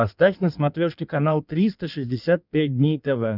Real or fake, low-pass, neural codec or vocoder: fake; 5.4 kHz; codec, 16 kHz in and 24 kHz out, 1.1 kbps, FireRedTTS-2 codec